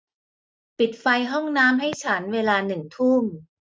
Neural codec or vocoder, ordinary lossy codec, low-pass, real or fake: none; none; none; real